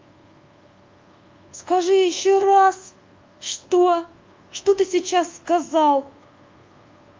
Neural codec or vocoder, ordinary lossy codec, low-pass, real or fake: codec, 24 kHz, 1.2 kbps, DualCodec; Opus, 24 kbps; 7.2 kHz; fake